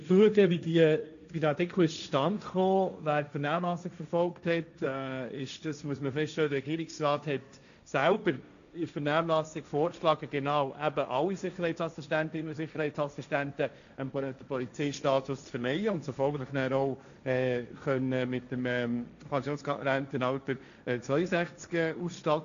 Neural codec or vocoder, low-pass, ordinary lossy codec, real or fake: codec, 16 kHz, 1.1 kbps, Voila-Tokenizer; 7.2 kHz; none; fake